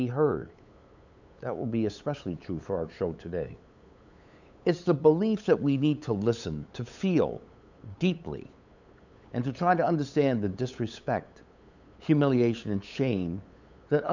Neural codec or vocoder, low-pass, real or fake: codec, 16 kHz, 8 kbps, FunCodec, trained on LibriTTS, 25 frames a second; 7.2 kHz; fake